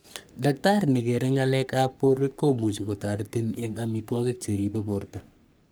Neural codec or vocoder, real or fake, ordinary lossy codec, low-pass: codec, 44.1 kHz, 3.4 kbps, Pupu-Codec; fake; none; none